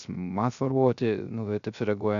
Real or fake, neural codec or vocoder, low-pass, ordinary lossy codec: fake; codec, 16 kHz, 0.3 kbps, FocalCodec; 7.2 kHz; MP3, 96 kbps